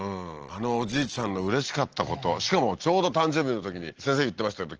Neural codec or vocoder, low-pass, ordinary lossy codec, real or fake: none; 7.2 kHz; Opus, 16 kbps; real